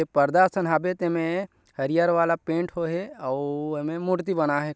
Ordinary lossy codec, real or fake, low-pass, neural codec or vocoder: none; real; none; none